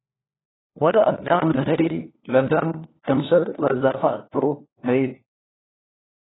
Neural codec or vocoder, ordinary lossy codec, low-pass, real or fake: codec, 16 kHz, 1 kbps, FunCodec, trained on LibriTTS, 50 frames a second; AAC, 16 kbps; 7.2 kHz; fake